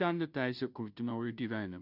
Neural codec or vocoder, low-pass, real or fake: codec, 16 kHz, 0.5 kbps, FunCodec, trained on Chinese and English, 25 frames a second; 5.4 kHz; fake